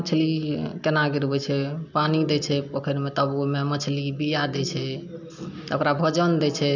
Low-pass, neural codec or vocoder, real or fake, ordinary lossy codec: 7.2 kHz; none; real; none